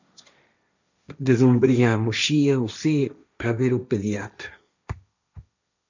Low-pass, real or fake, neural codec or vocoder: 7.2 kHz; fake; codec, 16 kHz, 1.1 kbps, Voila-Tokenizer